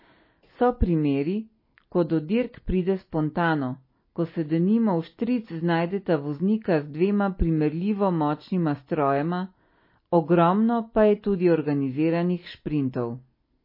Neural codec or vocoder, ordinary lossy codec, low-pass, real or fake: none; MP3, 24 kbps; 5.4 kHz; real